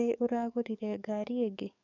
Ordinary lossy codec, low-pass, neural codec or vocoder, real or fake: none; 7.2 kHz; codec, 44.1 kHz, 7.8 kbps, DAC; fake